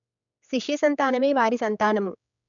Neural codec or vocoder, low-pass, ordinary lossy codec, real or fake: codec, 16 kHz, 4 kbps, X-Codec, HuBERT features, trained on general audio; 7.2 kHz; none; fake